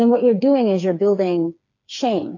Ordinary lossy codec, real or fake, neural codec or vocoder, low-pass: AAC, 48 kbps; fake; codec, 16 kHz, 4 kbps, FreqCodec, smaller model; 7.2 kHz